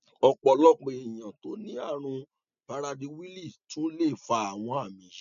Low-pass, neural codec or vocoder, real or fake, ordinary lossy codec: 7.2 kHz; none; real; none